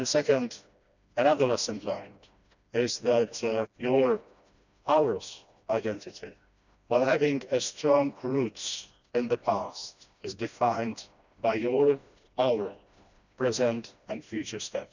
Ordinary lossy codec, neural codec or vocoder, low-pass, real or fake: none; codec, 16 kHz, 1 kbps, FreqCodec, smaller model; 7.2 kHz; fake